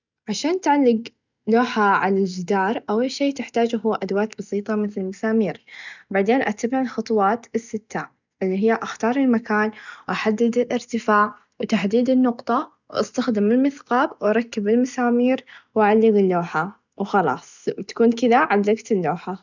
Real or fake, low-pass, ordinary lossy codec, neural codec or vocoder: real; 7.2 kHz; none; none